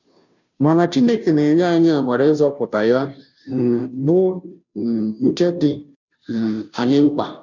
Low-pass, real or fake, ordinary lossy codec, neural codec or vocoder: 7.2 kHz; fake; none; codec, 16 kHz, 0.5 kbps, FunCodec, trained on Chinese and English, 25 frames a second